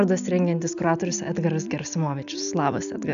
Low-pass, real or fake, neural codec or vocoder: 7.2 kHz; real; none